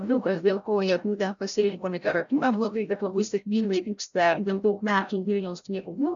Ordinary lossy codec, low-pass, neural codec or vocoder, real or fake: MP3, 96 kbps; 7.2 kHz; codec, 16 kHz, 0.5 kbps, FreqCodec, larger model; fake